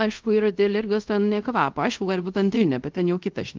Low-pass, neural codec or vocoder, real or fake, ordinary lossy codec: 7.2 kHz; codec, 24 kHz, 0.5 kbps, DualCodec; fake; Opus, 32 kbps